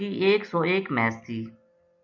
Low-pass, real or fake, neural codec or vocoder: 7.2 kHz; real; none